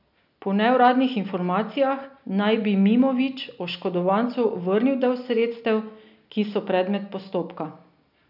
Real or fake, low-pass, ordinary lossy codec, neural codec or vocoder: real; 5.4 kHz; none; none